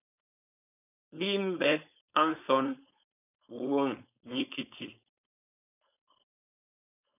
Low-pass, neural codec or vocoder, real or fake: 3.6 kHz; codec, 16 kHz, 4.8 kbps, FACodec; fake